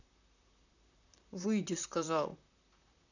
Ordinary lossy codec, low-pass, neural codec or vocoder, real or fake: none; 7.2 kHz; vocoder, 44.1 kHz, 128 mel bands, Pupu-Vocoder; fake